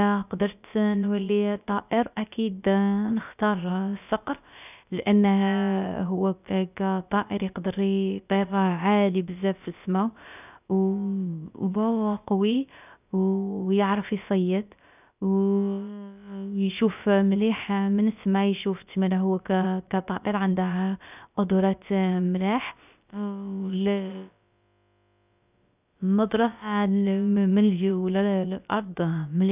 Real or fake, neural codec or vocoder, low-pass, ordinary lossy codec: fake; codec, 16 kHz, about 1 kbps, DyCAST, with the encoder's durations; 3.6 kHz; none